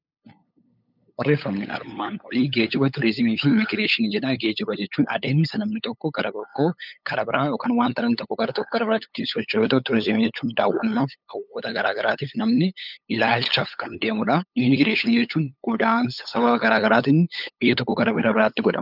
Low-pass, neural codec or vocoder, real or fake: 5.4 kHz; codec, 16 kHz, 8 kbps, FunCodec, trained on LibriTTS, 25 frames a second; fake